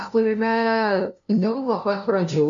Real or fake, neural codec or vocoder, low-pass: fake; codec, 16 kHz, 0.5 kbps, FunCodec, trained on LibriTTS, 25 frames a second; 7.2 kHz